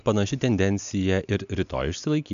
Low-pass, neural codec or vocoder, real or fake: 7.2 kHz; none; real